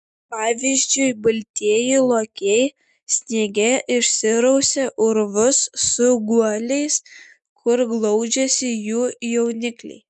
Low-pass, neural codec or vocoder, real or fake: 10.8 kHz; none; real